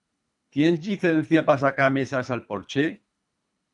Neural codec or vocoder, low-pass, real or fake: codec, 24 kHz, 3 kbps, HILCodec; 10.8 kHz; fake